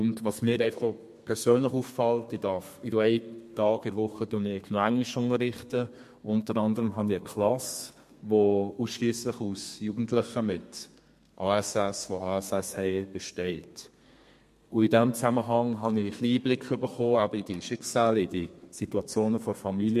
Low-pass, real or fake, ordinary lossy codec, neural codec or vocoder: 14.4 kHz; fake; MP3, 64 kbps; codec, 32 kHz, 1.9 kbps, SNAC